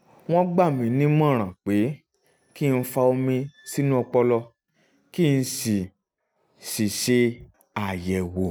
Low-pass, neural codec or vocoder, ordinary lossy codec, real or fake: none; none; none; real